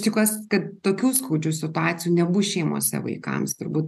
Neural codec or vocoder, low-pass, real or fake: none; 14.4 kHz; real